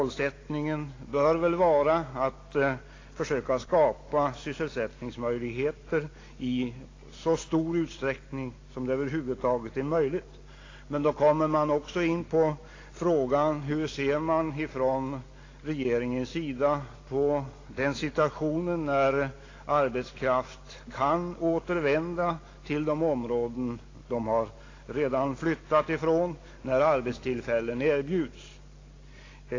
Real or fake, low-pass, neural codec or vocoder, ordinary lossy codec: real; 7.2 kHz; none; AAC, 32 kbps